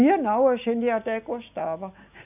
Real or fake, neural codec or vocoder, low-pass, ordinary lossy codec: real; none; 3.6 kHz; none